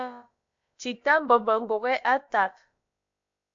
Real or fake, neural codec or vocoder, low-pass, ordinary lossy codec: fake; codec, 16 kHz, about 1 kbps, DyCAST, with the encoder's durations; 7.2 kHz; MP3, 48 kbps